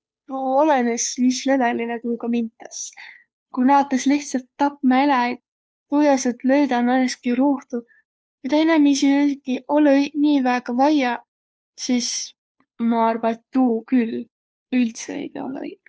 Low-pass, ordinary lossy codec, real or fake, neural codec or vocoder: none; none; fake; codec, 16 kHz, 2 kbps, FunCodec, trained on Chinese and English, 25 frames a second